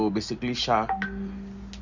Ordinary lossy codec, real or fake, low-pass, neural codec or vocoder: Opus, 64 kbps; real; 7.2 kHz; none